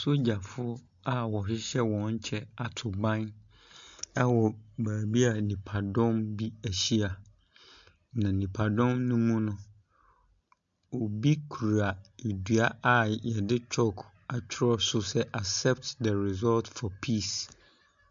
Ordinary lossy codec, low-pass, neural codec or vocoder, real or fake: MP3, 64 kbps; 7.2 kHz; none; real